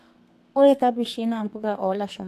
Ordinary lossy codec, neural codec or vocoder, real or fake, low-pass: AAC, 64 kbps; codec, 32 kHz, 1.9 kbps, SNAC; fake; 14.4 kHz